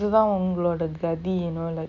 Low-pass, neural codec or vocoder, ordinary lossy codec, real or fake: 7.2 kHz; none; none; real